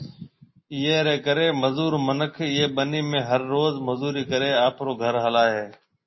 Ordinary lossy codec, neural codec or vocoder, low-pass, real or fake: MP3, 24 kbps; none; 7.2 kHz; real